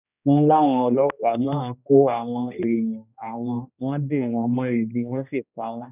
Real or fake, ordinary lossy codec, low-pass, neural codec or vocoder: fake; none; 3.6 kHz; codec, 16 kHz, 1 kbps, X-Codec, HuBERT features, trained on general audio